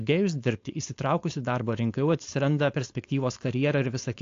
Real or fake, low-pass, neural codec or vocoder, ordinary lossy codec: fake; 7.2 kHz; codec, 16 kHz, 4.8 kbps, FACodec; AAC, 48 kbps